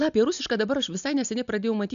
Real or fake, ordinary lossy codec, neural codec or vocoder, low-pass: real; MP3, 96 kbps; none; 7.2 kHz